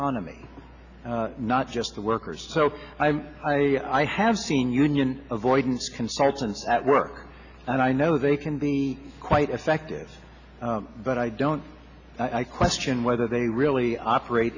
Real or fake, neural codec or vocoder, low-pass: real; none; 7.2 kHz